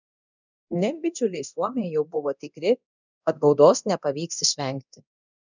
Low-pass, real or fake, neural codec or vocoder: 7.2 kHz; fake; codec, 24 kHz, 0.9 kbps, DualCodec